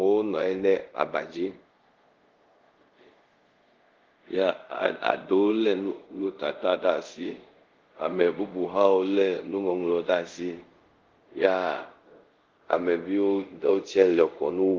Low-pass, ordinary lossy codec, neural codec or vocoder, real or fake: 7.2 kHz; Opus, 16 kbps; codec, 24 kHz, 0.5 kbps, DualCodec; fake